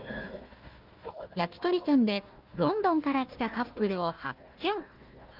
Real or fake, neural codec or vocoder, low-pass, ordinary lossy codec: fake; codec, 16 kHz, 1 kbps, FunCodec, trained on Chinese and English, 50 frames a second; 5.4 kHz; Opus, 24 kbps